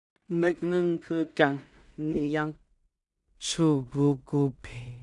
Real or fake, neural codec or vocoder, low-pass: fake; codec, 16 kHz in and 24 kHz out, 0.4 kbps, LongCat-Audio-Codec, two codebook decoder; 10.8 kHz